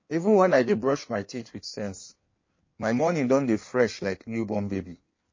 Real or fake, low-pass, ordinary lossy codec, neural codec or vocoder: fake; 7.2 kHz; MP3, 32 kbps; codec, 16 kHz in and 24 kHz out, 1.1 kbps, FireRedTTS-2 codec